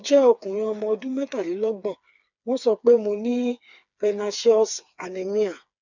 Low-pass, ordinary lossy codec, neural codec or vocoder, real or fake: 7.2 kHz; none; codec, 16 kHz, 4 kbps, FreqCodec, smaller model; fake